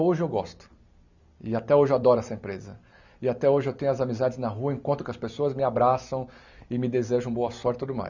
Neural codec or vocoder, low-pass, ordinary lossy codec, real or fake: none; 7.2 kHz; none; real